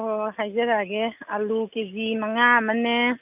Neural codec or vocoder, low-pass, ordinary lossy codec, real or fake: none; 3.6 kHz; none; real